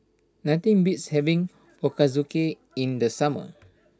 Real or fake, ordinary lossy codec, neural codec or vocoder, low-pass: real; none; none; none